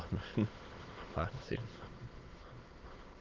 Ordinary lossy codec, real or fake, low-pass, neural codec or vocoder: Opus, 16 kbps; fake; 7.2 kHz; autoencoder, 22.05 kHz, a latent of 192 numbers a frame, VITS, trained on many speakers